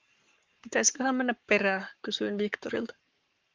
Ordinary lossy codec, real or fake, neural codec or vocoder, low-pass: Opus, 32 kbps; fake; codec, 44.1 kHz, 7.8 kbps, Pupu-Codec; 7.2 kHz